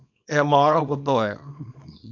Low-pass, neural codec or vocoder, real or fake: 7.2 kHz; codec, 24 kHz, 0.9 kbps, WavTokenizer, small release; fake